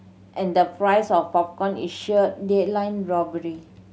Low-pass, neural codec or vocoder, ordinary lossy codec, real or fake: none; none; none; real